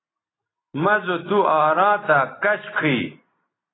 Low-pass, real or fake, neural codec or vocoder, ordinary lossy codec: 7.2 kHz; real; none; AAC, 16 kbps